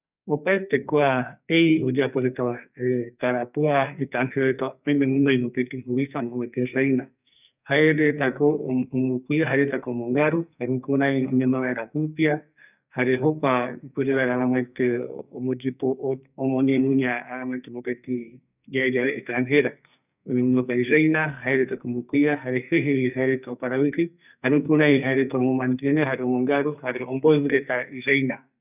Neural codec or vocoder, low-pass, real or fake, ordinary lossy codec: codec, 44.1 kHz, 2.6 kbps, SNAC; 3.6 kHz; fake; none